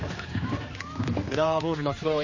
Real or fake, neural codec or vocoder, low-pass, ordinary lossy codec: fake; codec, 16 kHz, 2 kbps, X-Codec, HuBERT features, trained on general audio; 7.2 kHz; MP3, 32 kbps